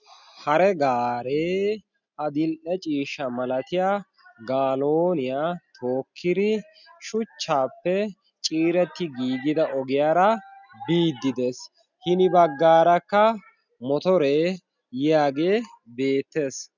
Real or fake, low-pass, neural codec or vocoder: real; 7.2 kHz; none